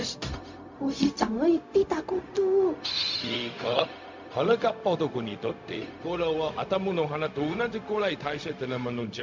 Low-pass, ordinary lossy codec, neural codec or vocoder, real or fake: 7.2 kHz; MP3, 64 kbps; codec, 16 kHz, 0.4 kbps, LongCat-Audio-Codec; fake